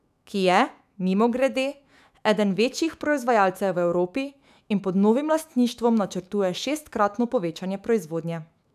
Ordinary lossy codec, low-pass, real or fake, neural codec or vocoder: none; 14.4 kHz; fake; autoencoder, 48 kHz, 128 numbers a frame, DAC-VAE, trained on Japanese speech